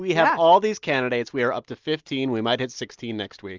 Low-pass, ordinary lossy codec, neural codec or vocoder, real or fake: 7.2 kHz; Opus, 32 kbps; none; real